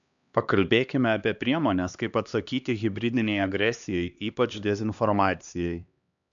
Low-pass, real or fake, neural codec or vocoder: 7.2 kHz; fake; codec, 16 kHz, 2 kbps, X-Codec, HuBERT features, trained on LibriSpeech